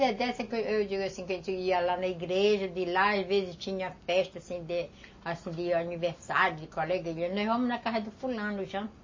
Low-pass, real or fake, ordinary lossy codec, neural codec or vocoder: 7.2 kHz; real; MP3, 32 kbps; none